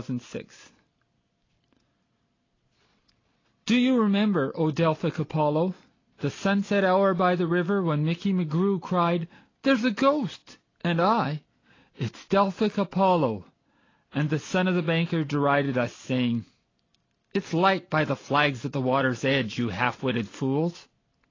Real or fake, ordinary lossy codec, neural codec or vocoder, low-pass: real; AAC, 32 kbps; none; 7.2 kHz